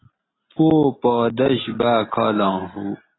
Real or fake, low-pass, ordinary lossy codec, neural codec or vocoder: real; 7.2 kHz; AAC, 16 kbps; none